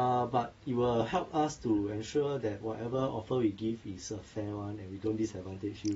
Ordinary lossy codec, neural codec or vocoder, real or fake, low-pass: AAC, 24 kbps; none; real; 10.8 kHz